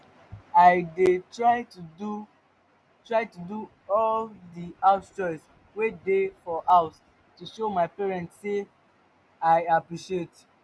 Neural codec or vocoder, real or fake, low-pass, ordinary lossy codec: none; real; none; none